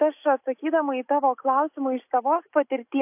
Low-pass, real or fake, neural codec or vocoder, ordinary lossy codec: 3.6 kHz; real; none; AAC, 32 kbps